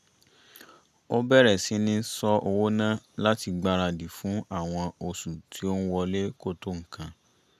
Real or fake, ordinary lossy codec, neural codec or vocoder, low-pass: real; none; none; 14.4 kHz